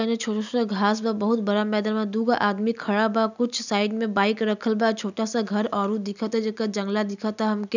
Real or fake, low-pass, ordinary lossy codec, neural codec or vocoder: real; 7.2 kHz; none; none